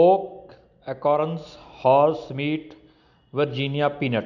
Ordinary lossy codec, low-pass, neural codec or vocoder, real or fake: none; 7.2 kHz; none; real